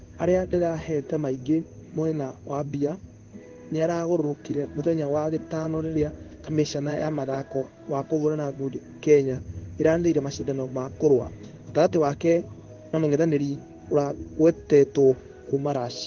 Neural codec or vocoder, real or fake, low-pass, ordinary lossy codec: codec, 16 kHz in and 24 kHz out, 1 kbps, XY-Tokenizer; fake; 7.2 kHz; Opus, 16 kbps